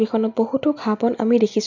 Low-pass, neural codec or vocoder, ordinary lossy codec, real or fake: 7.2 kHz; none; none; real